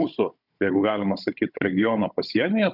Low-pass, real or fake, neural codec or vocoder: 5.4 kHz; fake; codec, 16 kHz, 16 kbps, FunCodec, trained on Chinese and English, 50 frames a second